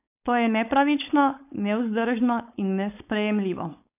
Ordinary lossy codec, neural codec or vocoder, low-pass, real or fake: none; codec, 16 kHz, 4.8 kbps, FACodec; 3.6 kHz; fake